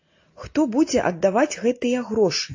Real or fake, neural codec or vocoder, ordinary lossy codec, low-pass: real; none; AAC, 48 kbps; 7.2 kHz